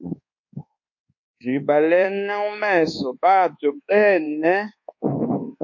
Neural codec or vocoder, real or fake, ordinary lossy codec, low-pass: codec, 24 kHz, 1.2 kbps, DualCodec; fake; MP3, 48 kbps; 7.2 kHz